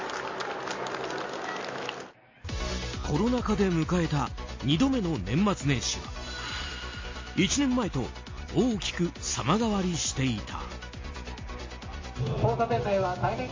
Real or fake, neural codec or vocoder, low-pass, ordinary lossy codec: real; none; 7.2 kHz; MP3, 32 kbps